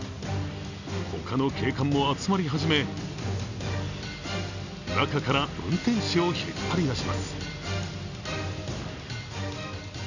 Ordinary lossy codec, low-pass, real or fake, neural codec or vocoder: none; 7.2 kHz; real; none